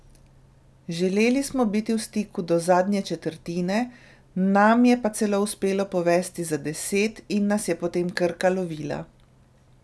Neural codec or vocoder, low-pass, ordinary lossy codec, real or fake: none; none; none; real